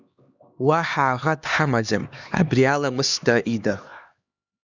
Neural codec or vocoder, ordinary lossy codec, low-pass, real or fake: codec, 16 kHz, 2 kbps, X-Codec, HuBERT features, trained on LibriSpeech; Opus, 64 kbps; 7.2 kHz; fake